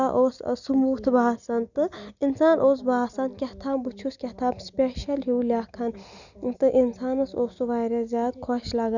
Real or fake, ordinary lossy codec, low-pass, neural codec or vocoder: real; none; 7.2 kHz; none